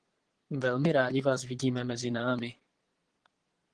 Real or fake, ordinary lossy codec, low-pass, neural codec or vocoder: fake; Opus, 16 kbps; 9.9 kHz; vocoder, 22.05 kHz, 80 mel bands, Vocos